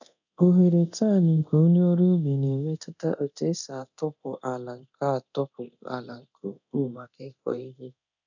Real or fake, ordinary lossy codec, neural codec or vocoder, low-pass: fake; none; codec, 24 kHz, 0.9 kbps, DualCodec; 7.2 kHz